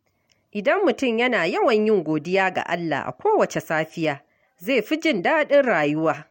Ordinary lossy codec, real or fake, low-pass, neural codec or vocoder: MP3, 64 kbps; real; 19.8 kHz; none